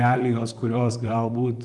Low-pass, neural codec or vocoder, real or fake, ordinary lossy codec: 10.8 kHz; vocoder, 44.1 kHz, 128 mel bands, Pupu-Vocoder; fake; Opus, 32 kbps